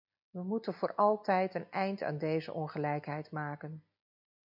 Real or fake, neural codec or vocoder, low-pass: real; none; 5.4 kHz